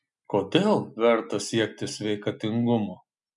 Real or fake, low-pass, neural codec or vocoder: real; 10.8 kHz; none